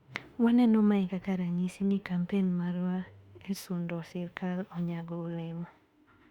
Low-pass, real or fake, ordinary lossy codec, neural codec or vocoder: 19.8 kHz; fake; Opus, 64 kbps; autoencoder, 48 kHz, 32 numbers a frame, DAC-VAE, trained on Japanese speech